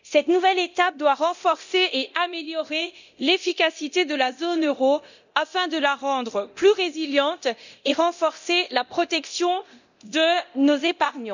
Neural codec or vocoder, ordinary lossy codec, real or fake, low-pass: codec, 24 kHz, 0.9 kbps, DualCodec; none; fake; 7.2 kHz